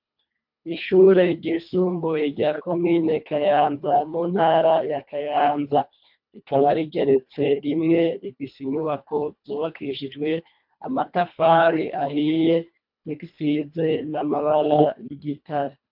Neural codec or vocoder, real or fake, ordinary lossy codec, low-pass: codec, 24 kHz, 1.5 kbps, HILCodec; fake; MP3, 48 kbps; 5.4 kHz